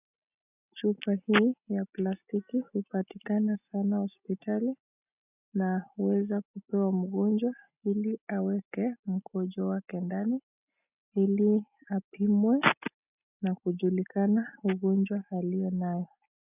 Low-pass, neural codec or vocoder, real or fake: 3.6 kHz; none; real